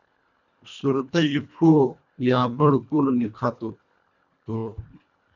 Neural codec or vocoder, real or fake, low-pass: codec, 24 kHz, 1.5 kbps, HILCodec; fake; 7.2 kHz